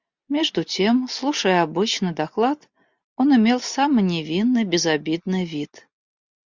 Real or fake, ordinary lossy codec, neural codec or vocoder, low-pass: real; Opus, 64 kbps; none; 7.2 kHz